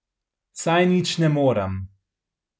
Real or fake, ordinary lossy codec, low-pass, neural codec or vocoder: real; none; none; none